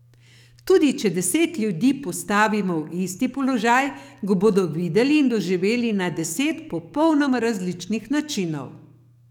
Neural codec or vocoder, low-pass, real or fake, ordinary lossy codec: codec, 44.1 kHz, 7.8 kbps, DAC; 19.8 kHz; fake; none